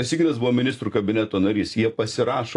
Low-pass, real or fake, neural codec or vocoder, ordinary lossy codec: 10.8 kHz; real; none; AAC, 48 kbps